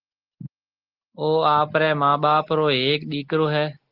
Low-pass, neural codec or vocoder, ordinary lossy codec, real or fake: 5.4 kHz; none; Opus, 16 kbps; real